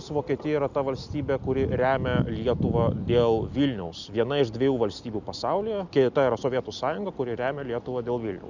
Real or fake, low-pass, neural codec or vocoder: real; 7.2 kHz; none